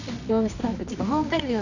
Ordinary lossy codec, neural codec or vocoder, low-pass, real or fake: none; codec, 24 kHz, 0.9 kbps, WavTokenizer, medium music audio release; 7.2 kHz; fake